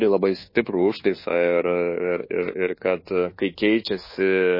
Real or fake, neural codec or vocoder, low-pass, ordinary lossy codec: fake; codec, 24 kHz, 1.2 kbps, DualCodec; 5.4 kHz; MP3, 24 kbps